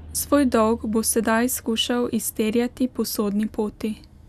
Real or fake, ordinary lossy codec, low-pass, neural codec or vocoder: real; none; 14.4 kHz; none